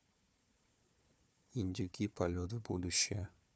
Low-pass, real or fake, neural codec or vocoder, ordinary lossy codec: none; fake; codec, 16 kHz, 4 kbps, FunCodec, trained on Chinese and English, 50 frames a second; none